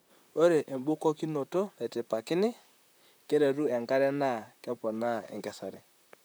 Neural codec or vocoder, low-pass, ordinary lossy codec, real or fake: vocoder, 44.1 kHz, 128 mel bands, Pupu-Vocoder; none; none; fake